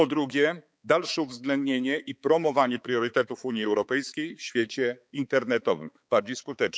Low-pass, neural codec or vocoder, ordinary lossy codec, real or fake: none; codec, 16 kHz, 4 kbps, X-Codec, HuBERT features, trained on balanced general audio; none; fake